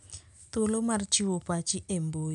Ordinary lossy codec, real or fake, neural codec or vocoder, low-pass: none; real; none; 10.8 kHz